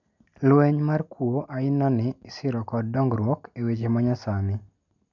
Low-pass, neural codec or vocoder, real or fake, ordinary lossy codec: 7.2 kHz; none; real; none